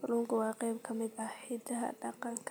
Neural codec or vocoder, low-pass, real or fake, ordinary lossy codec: none; none; real; none